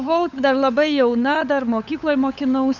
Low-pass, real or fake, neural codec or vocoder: 7.2 kHz; fake; codec, 16 kHz, 8 kbps, FunCodec, trained on LibriTTS, 25 frames a second